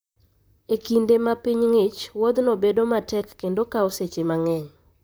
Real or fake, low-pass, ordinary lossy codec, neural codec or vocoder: fake; none; none; vocoder, 44.1 kHz, 128 mel bands, Pupu-Vocoder